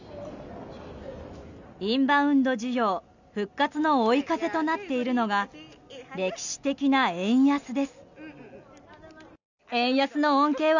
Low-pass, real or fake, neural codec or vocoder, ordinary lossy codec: 7.2 kHz; real; none; none